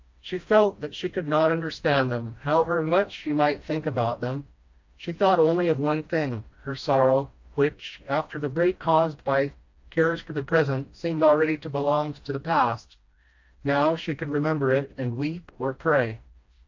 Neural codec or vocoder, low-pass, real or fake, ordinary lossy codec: codec, 16 kHz, 1 kbps, FreqCodec, smaller model; 7.2 kHz; fake; AAC, 48 kbps